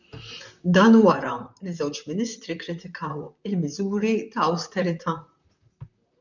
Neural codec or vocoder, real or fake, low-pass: vocoder, 44.1 kHz, 128 mel bands, Pupu-Vocoder; fake; 7.2 kHz